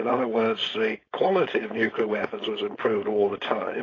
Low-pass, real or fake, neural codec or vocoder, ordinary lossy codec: 7.2 kHz; fake; codec, 16 kHz, 4.8 kbps, FACodec; AAC, 32 kbps